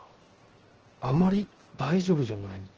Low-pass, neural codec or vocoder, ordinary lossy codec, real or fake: 7.2 kHz; codec, 16 kHz, 0.7 kbps, FocalCodec; Opus, 16 kbps; fake